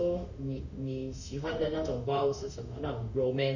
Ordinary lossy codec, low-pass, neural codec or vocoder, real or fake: none; 7.2 kHz; autoencoder, 48 kHz, 32 numbers a frame, DAC-VAE, trained on Japanese speech; fake